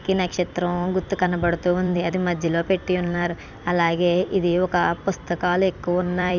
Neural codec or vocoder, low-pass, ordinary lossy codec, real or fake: vocoder, 44.1 kHz, 128 mel bands every 256 samples, BigVGAN v2; 7.2 kHz; none; fake